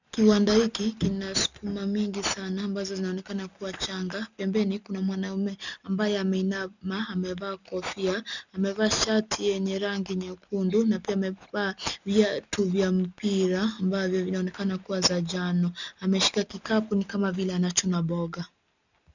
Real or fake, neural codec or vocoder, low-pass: real; none; 7.2 kHz